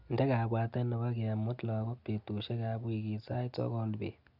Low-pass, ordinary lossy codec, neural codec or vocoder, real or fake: 5.4 kHz; none; none; real